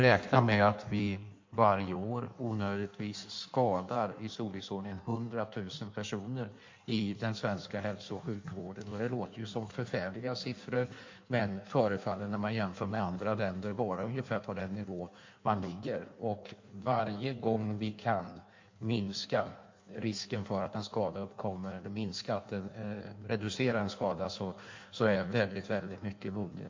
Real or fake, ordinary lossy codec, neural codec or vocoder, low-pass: fake; MP3, 48 kbps; codec, 16 kHz in and 24 kHz out, 1.1 kbps, FireRedTTS-2 codec; 7.2 kHz